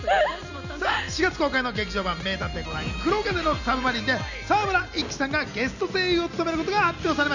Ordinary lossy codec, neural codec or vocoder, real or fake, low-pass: none; none; real; 7.2 kHz